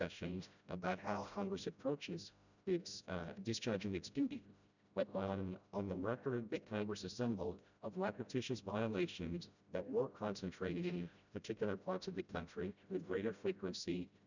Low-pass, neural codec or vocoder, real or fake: 7.2 kHz; codec, 16 kHz, 0.5 kbps, FreqCodec, smaller model; fake